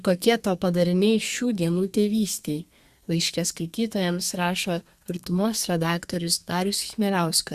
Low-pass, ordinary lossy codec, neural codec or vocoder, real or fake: 14.4 kHz; Opus, 64 kbps; codec, 32 kHz, 1.9 kbps, SNAC; fake